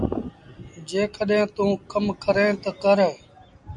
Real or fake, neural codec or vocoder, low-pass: real; none; 10.8 kHz